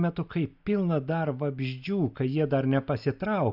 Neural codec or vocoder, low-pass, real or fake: none; 5.4 kHz; real